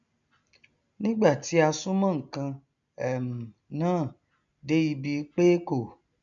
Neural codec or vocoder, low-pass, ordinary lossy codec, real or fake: none; 7.2 kHz; none; real